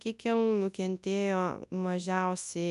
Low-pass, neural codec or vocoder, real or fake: 10.8 kHz; codec, 24 kHz, 0.9 kbps, WavTokenizer, large speech release; fake